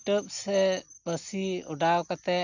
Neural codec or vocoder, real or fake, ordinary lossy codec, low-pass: none; real; none; 7.2 kHz